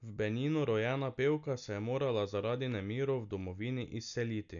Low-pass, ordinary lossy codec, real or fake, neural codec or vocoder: 7.2 kHz; none; real; none